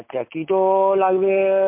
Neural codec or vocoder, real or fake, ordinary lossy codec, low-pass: none; real; MP3, 32 kbps; 3.6 kHz